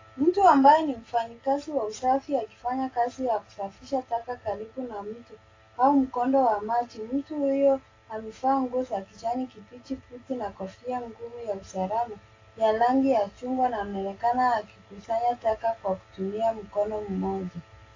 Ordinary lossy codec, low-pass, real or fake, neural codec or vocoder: AAC, 32 kbps; 7.2 kHz; real; none